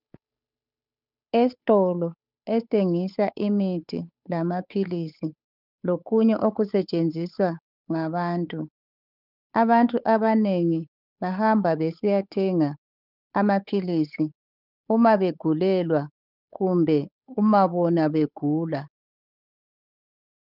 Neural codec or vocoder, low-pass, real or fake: codec, 16 kHz, 8 kbps, FunCodec, trained on Chinese and English, 25 frames a second; 5.4 kHz; fake